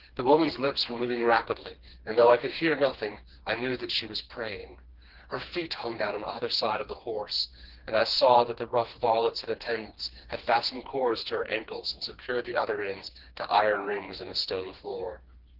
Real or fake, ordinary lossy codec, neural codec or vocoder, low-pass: fake; Opus, 16 kbps; codec, 16 kHz, 2 kbps, FreqCodec, smaller model; 5.4 kHz